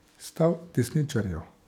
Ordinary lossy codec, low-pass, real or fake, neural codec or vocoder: none; 19.8 kHz; fake; codec, 44.1 kHz, 7.8 kbps, DAC